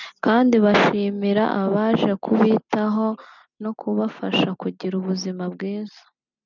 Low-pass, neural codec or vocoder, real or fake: 7.2 kHz; none; real